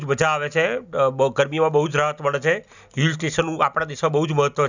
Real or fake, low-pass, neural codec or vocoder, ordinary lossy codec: real; 7.2 kHz; none; none